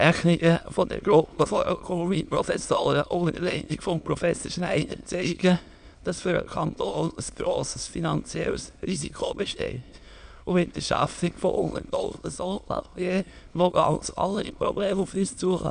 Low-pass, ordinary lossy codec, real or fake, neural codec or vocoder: 9.9 kHz; none; fake; autoencoder, 22.05 kHz, a latent of 192 numbers a frame, VITS, trained on many speakers